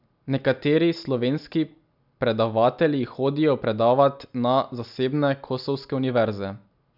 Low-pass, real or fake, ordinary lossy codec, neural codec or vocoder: 5.4 kHz; real; none; none